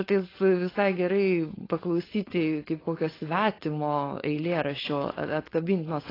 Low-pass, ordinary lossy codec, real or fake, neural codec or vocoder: 5.4 kHz; AAC, 24 kbps; fake; codec, 16 kHz, 4.8 kbps, FACodec